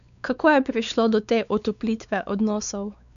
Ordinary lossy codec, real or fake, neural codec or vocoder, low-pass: none; fake; codec, 16 kHz, 4 kbps, X-Codec, WavLM features, trained on Multilingual LibriSpeech; 7.2 kHz